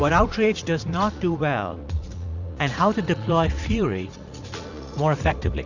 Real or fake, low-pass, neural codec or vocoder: fake; 7.2 kHz; vocoder, 44.1 kHz, 80 mel bands, Vocos